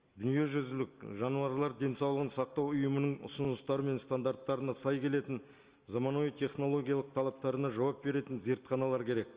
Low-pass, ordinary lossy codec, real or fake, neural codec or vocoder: 3.6 kHz; Opus, 24 kbps; real; none